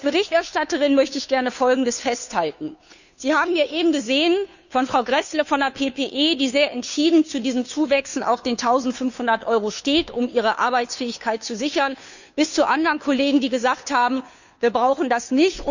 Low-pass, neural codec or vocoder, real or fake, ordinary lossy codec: 7.2 kHz; codec, 16 kHz, 2 kbps, FunCodec, trained on Chinese and English, 25 frames a second; fake; none